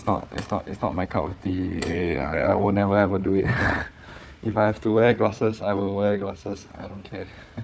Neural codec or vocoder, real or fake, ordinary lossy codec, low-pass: codec, 16 kHz, 4 kbps, FunCodec, trained on Chinese and English, 50 frames a second; fake; none; none